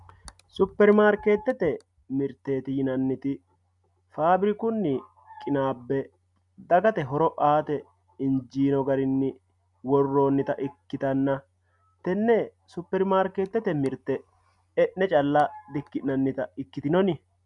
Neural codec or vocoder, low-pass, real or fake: none; 10.8 kHz; real